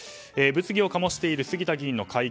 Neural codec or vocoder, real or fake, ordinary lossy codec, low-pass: none; real; none; none